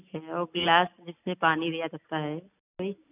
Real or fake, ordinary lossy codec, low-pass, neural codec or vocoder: real; none; 3.6 kHz; none